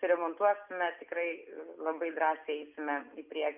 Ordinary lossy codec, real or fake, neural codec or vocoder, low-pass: Opus, 64 kbps; real; none; 3.6 kHz